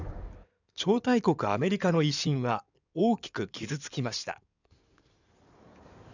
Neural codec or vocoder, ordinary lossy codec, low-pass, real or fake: codec, 16 kHz in and 24 kHz out, 2.2 kbps, FireRedTTS-2 codec; none; 7.2 kHz; fake